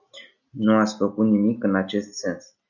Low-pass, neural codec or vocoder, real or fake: 7.2 kHz; none; real